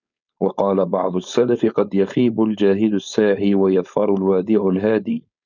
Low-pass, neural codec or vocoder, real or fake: 7.2 kHz; codec, 16 kHz, 4.8 kbps, FACodec; fake